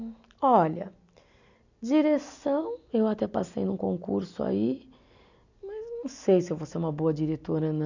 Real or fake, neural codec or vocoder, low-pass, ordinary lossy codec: real; none; 7.2 kHz; none